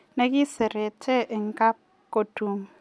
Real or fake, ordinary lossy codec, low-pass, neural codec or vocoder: real; none; none; none